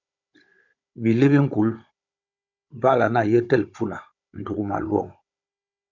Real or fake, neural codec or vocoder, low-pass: fake; codec, 16 kHz, 16 kbps, FunCodec, trained on Chinese and English, 50 frames a second; 7.2 kHz